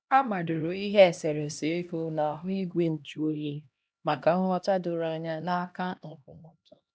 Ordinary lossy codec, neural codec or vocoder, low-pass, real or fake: none; codec, 16 kHz, 1 kbps, X-Codec, HuBERT features, trained on LibriSpeech; none; fake